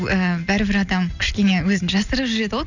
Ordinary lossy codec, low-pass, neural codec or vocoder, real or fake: none; 7.2 kHz; none; real